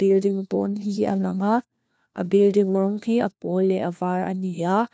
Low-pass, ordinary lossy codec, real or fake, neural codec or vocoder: none; none; fake; codec, 16 kHz, 1 kbps, FunCodec, trained on LibriTTS, 50 frames a second